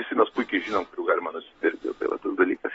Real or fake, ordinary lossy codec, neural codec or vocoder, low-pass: real; AAC, 24 kbps; none; 10.8 kHz